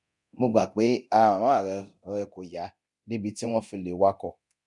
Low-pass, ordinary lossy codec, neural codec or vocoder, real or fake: 10.8 kHz; none; codec, 24 kHz, 0.9 kbps, DualCodec; fake